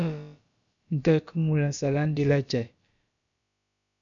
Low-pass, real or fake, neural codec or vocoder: 7.2 kHz; fake; codec, 16 kHz, about 1 kbps, DyCAST, with the encoder's durations